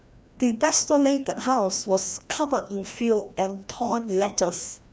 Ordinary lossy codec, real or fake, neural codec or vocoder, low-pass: none; fake; codec, 16 kHz, 1 kbps, FreqCodec, larger model; none